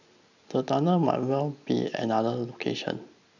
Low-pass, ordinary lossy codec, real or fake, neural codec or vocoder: 7.2 kHz; none; real; none